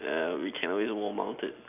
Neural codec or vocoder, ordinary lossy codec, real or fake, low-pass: none; none; real; 3.6 kHz